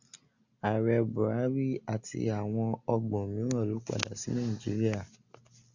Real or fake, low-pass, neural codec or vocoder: real; 7.2 kHz; none